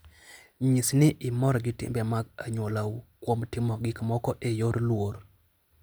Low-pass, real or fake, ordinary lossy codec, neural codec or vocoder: none; real; none; none